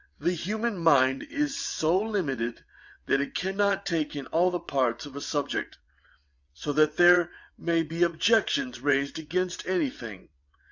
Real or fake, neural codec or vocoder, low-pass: fake; vocoder, 22.05 kHz, 80 mel bands, WaveNeXt; 7.2 kHz